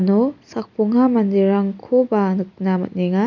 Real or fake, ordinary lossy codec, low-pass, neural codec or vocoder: real; none; 7.2 kHz; none